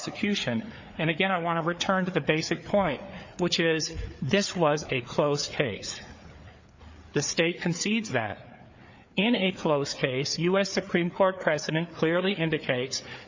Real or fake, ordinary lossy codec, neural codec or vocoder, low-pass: fake; MP3, 48 kbps; codec, 16 kHz, 4 kbps, FreqCodec, larger model; 7.2 kHz